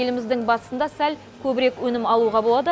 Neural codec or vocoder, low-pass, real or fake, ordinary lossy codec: none; none; real; none